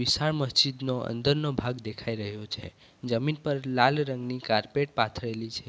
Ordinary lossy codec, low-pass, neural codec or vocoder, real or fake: none; none; none; real